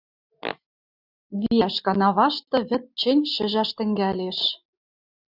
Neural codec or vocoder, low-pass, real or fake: none; 5.4 kHz; real